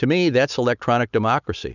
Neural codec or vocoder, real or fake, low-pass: none; real; 7.2 kHz